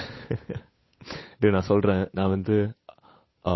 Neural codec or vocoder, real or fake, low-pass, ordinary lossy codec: codec, 16 kHz, 8 kbps, FunCodec, trained on Chinese and English, 25 frames a second; fake; 7.2 kHz; MP3, 24 kbps